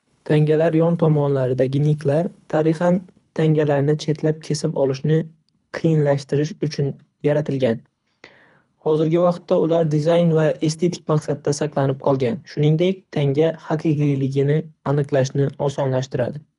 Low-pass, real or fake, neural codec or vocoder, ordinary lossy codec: 10.8 kHz; fake; codec, 24 kHz, 3 kbps, HILCodec; none